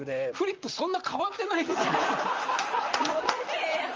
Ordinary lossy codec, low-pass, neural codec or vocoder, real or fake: Opus, 24 kbps; 7.2 kHz; vocoder, 44.1 kHz, 128 mel bands, Pupu-Vocoder; fake